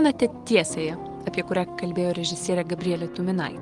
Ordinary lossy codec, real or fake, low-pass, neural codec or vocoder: Opus, 24 kbps; real; 10.8 kHz; none